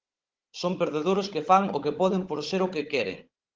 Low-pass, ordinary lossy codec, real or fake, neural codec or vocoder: 7.2 kHz; Opus, 16 kbps; fake; codec, 16 kHz, 16 kbps, FunCodec, trained on Chinese and English, 50 frames a second